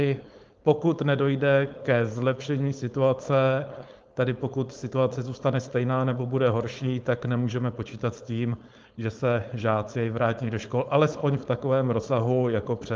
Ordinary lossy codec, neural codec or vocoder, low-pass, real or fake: Opus, 32 kbps; codec, 16 kHz, 4.8 kbps, FACodec; 7.2 kHz; fake